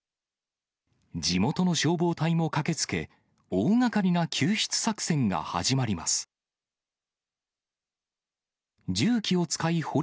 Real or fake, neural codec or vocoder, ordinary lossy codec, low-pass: real; none; none; none